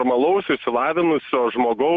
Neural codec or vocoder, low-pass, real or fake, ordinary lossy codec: none; 7.2 kHz; real; Opus, 64 kbps